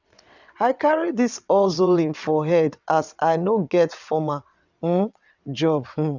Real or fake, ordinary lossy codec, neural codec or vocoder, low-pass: fake; none; vocoder, 22.05 kHz, 80 mel bands, WaveNeXt; 7.2 kHz